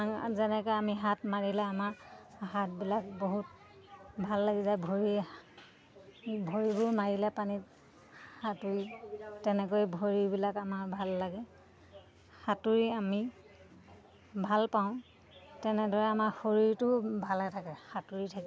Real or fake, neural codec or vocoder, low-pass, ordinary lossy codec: real; none; none; none